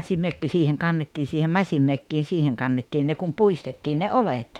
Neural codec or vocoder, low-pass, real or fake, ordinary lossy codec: autoencoder, 48 kHz, 32 numbers a frame, DAC-VAE, trained on Japanese speech; 19.8 kHz; fake; none